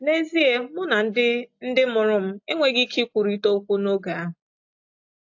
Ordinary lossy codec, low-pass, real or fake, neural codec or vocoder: AAC, 48 kbps; 7.2 kHz; real; none